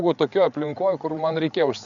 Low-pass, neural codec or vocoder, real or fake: 7.2 kHz; codec, 16 kHz, 8 kbps, FreqCodec, larger model; fake